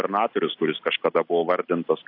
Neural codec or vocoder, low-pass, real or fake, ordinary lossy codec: none; 5.4 kHz; real; AAC, 32 kbps